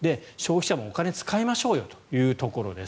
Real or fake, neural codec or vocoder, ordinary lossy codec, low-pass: real; none; none; none